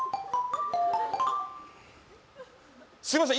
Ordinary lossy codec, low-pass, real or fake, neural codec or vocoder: none; none; real; none